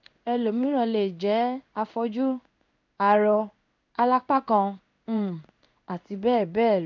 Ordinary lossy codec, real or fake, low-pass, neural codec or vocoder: MP3, 64 kbps; fake; 7.2 kHz; codec, 16 kHz in and 24 kHz out, 1 kbps, XY-Tokenizer